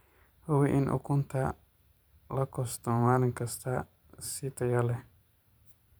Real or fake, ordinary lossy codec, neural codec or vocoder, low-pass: fake; none; vocoder, 44.1 kHz, 128 mel bands every 512 samples, BigVGAN v2; none